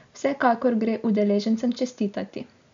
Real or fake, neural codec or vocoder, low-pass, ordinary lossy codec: real; none; 7.2 kHz; MP3, 64 kbps